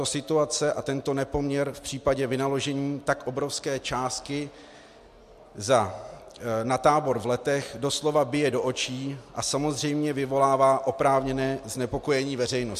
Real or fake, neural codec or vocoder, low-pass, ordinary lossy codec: real; none; 14.4 kHz; MP3, 64 kbps